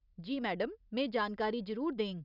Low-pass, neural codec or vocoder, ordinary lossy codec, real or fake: 5.4 kHz; none; none; real